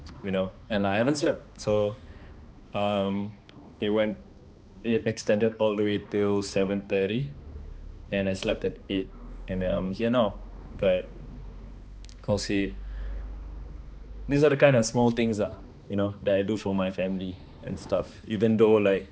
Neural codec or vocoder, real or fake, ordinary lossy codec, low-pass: codec, 16 kHz, 2 kbps, X-Codec, HuBERT features, trained on balanced general audio; fake; none; none